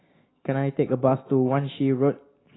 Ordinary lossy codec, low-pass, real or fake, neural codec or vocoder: AAC, 16 kbps; 7.2 kHz; real; none